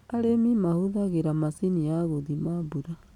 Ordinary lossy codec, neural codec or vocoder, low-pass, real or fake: none; none; 19.8 kHz; real